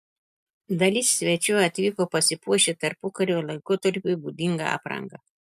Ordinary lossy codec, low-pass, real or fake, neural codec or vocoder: MP3, 96 kbps; 14.4 kHz; real; none